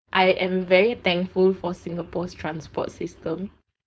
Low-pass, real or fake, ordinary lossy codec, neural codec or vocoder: none; fake; none; codec, 16 kHz, 4.8 kbps, FACodec